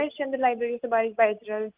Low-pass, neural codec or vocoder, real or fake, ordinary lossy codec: 3.6 kHz; none; real; Opus, 32 kbps